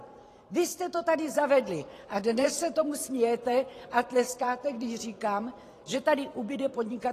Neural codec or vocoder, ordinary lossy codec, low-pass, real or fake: vocoder, 44.1 kHz, 128 mel bands every 512 samples, BigVGAN v2; AAC, 48 kbps; 14.4 kHz; fake